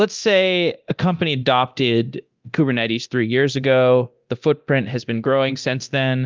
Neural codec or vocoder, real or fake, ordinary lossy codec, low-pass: codec, 24 kHz, 0.9 kbps, DualCodec; fake; Opus, 32 kbps; 7.2 kHz